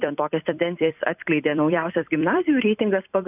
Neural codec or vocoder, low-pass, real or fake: vocoder, 44.1 kHz, 128 mel bands every 256 samples, BigVGAN v2; 3.6 kHz; fake